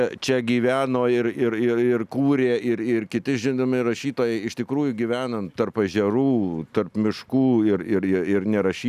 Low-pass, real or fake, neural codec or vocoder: 14.4 kHz; real; none